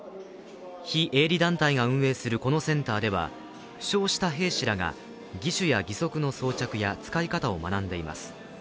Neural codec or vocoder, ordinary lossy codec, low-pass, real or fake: none; none; none; real